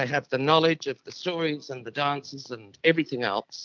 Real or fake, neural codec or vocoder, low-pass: real; none; 7.2 kHz